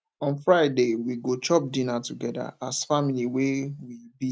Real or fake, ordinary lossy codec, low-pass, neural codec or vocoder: real; none; none; none